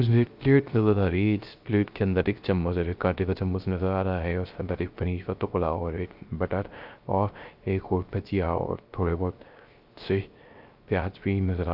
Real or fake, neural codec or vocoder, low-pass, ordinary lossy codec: fake; codec, 16 kHz, 0.3 kbps, FocalCodec; 5.4 kHz; Opus, 24 kbps